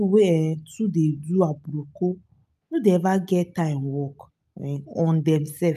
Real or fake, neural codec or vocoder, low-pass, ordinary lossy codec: real; none; 14.4 kHz; none